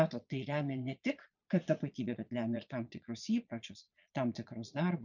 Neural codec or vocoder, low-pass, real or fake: vocoder, 22.05 kHz, 80 mel bands, Vocos; 7.2 kHz; fake